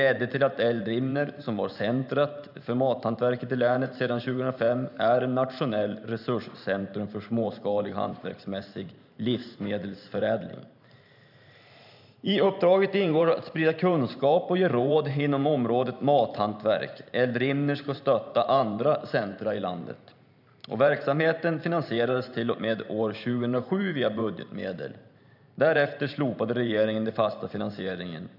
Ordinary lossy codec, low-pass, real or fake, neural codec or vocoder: none; 5.4 kHz; fake; vocoder, 44.1 kHz, 128 mel bands every 512 samples, BigVGAN v2